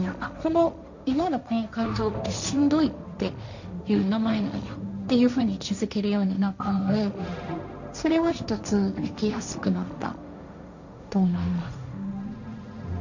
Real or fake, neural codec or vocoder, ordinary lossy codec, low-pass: fake; codec, 16 kHz, 1.1 kbps, Voila-Tokenizer; none; 7.2 kHz